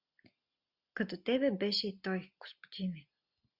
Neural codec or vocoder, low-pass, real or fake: none; 5.4 kHz; real